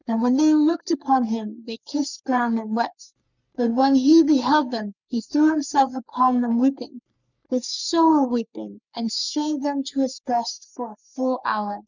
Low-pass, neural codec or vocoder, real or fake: 7.2 kHz; codec, 44.1 kHz, 3.4 kbps, Pupu-Codec; fake